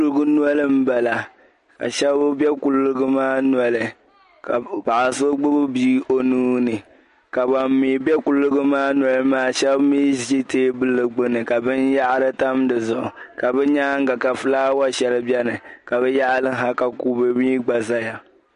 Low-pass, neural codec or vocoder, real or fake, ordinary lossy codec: 10.8 kHz; none; real; MP3, 48 kbps